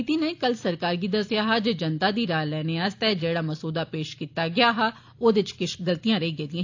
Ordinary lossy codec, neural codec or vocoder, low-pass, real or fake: AAC, 48 kbps; none; 7.2 kHz; real